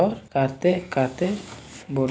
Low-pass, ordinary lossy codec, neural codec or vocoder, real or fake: none; none; none; real